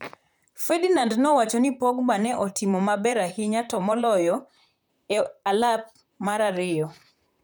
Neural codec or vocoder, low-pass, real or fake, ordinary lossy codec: vocoder, 44.1 kHz, 128 mel bands, Pupu-Vocoder; none; fake; none